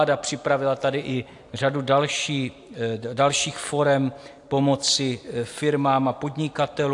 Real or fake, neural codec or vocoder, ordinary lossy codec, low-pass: real; none; AAC, 64 kbps; 10.8 kHz